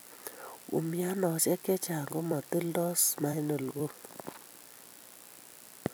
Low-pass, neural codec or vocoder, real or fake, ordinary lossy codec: none; vocoder, 44.1 kHz, 128 mel bands every 256 samples, BigVGAN v2; fake; none